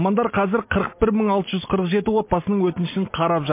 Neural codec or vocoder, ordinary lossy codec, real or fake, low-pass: none; MP3, 24 kbps; real; 3.6 kHz